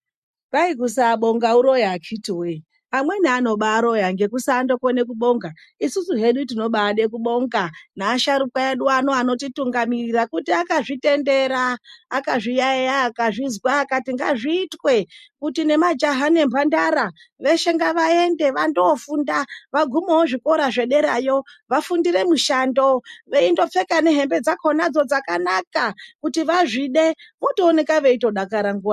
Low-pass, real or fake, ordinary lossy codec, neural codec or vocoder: 14.4 kHz; real; MP3, 64 kbps; none